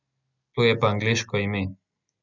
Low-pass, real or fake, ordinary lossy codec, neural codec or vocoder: 7.2 kHz; real; none; none